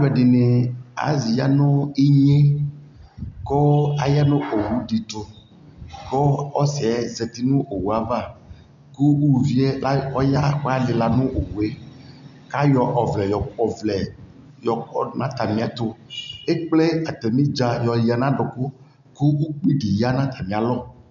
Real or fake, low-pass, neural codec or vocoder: real; 7.2 kHz; none